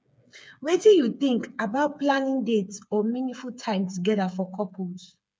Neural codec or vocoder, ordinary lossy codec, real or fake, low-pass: codec, 16 kHz, 8 kbps, FreqCodec, smaller model; none; fake; none